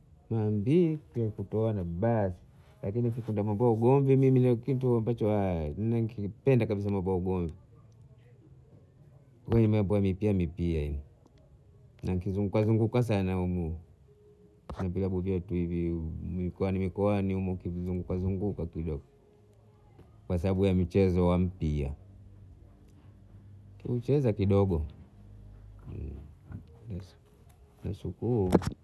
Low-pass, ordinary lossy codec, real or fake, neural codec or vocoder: none; none; real; none